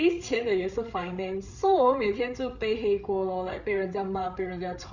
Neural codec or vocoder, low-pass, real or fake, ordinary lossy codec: codec, 16 kHz, 8 kbps, FreqCodec, larger model; 7.2 kHz; fake; none